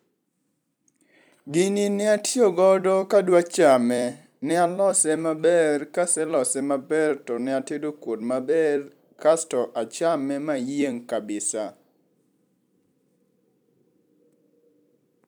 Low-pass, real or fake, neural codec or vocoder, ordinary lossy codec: none; fake; vocoder, 44.1 kHz, 128 mel bands every 256 samples, BigVGAN v2; none